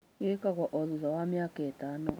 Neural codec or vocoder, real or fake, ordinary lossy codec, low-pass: none; real; none; none